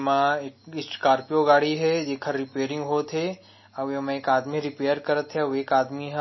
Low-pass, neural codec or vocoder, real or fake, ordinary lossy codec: 7.2 kHz; none; real; MP3, 24 kbps